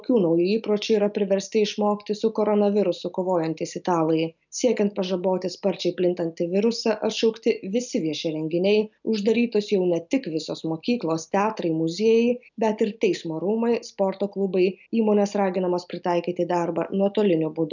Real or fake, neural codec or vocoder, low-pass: real; none; 7.2 kHz